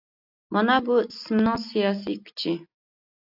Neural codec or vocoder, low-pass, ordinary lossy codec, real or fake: none; 5.4 kHz; AAC, 48 kbps; real